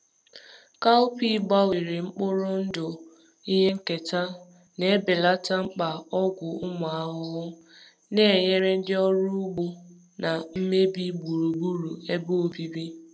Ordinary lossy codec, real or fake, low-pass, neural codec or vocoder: none; real; none; none